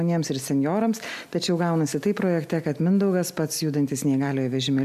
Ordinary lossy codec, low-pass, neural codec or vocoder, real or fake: MP3, 96 kbps; 14.4 kHz; none; real